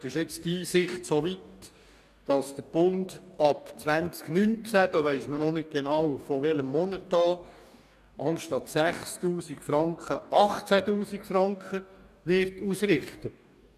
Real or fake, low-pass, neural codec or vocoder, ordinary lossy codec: fake; 14.4 kHz; codec, 44.1 kHz, 2.6 kbps, DAC; none